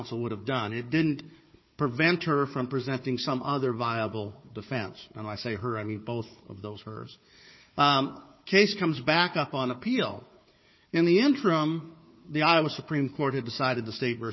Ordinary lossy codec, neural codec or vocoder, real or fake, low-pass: MP3, 24 kbps; codec, 16 kHz, 4 kbps, FunCodec, trained on Chinese and English, 50 frames a second; fake; 7.2 kHz